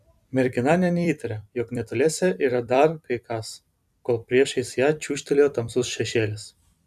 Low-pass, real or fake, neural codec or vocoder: 14.4 kHz; fake; vocoder, 44.1 kHz, 128 mel bands every 256 samples, BigVGAN v2